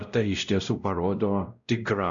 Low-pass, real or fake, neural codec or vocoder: 7.2 kHz; fake; codec, 16 kHz, 0.5 kbps, X-Codec, WavLM features, trained on Multilingual LibriSpeech